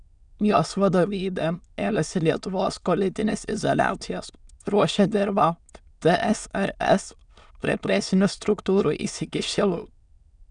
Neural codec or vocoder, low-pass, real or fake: autoencoder, 22.05 kHz, a latent of 192 numbers a frame, VITS, trained on many speakers; 9.9 kHz; fake